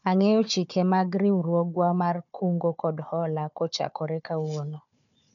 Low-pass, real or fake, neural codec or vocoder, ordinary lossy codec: 7.2 kHz; fake; codec, 16 kHz, 4 kbps, FunCodec, trained on Chinese and English, 50 frames a second; none